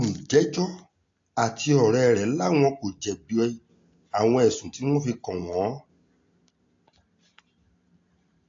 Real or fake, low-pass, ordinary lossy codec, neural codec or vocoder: real; 7.2 kHz; AAC, 48 kbps; none